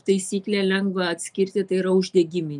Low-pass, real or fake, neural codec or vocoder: 10.8 kHz; real; none